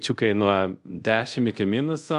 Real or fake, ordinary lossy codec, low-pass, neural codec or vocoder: fake; MP3, 64 kbps; 10.8 kHz; codec, 24 kHz, 0.5 kbps, DualCodec